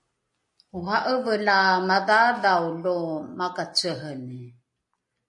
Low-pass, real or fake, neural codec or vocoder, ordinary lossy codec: 10.8 kHz; real; none; MP3, 48 kbps